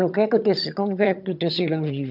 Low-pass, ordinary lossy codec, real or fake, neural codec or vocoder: 5.4 kHz; none; fake; vocoder, 22.05 kHz, 80 mel bands, HiFi-GAN